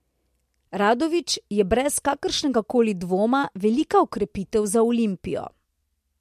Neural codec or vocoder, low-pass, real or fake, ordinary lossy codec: none; 14.4 kHz; real; MP3, 64 kbps